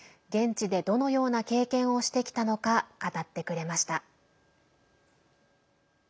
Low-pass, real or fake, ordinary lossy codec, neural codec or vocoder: none; real; none; none